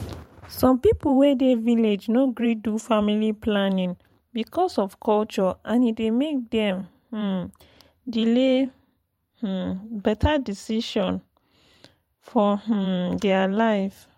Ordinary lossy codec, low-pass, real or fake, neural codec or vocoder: MP3, 64 kbps; 19.8 kHz; fake; vocoder, 44.1 kHz, 128 mel bands every 512 samples, BigVGAN v2